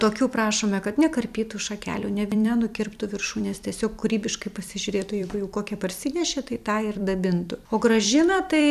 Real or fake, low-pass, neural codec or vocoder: fake; 14.4 kHz; vocoder, 44.1 kHz, 128 mel bands every 256 samples, BigVGAN v2